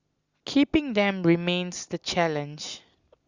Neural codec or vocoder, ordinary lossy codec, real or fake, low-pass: none; Opus, 64 kbps; real; 7.2 kHz